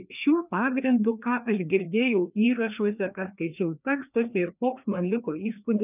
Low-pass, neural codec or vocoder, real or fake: 3.6 kHz; codec, 16 kHz, 2 kbps, FreqCodec, larger model; fake